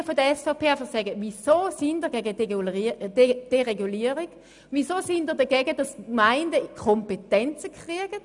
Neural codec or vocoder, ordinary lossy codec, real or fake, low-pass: none; none; real; 10.8 kHz